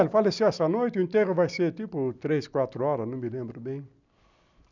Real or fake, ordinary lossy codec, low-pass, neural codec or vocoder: real; none; 7.2 kHz; none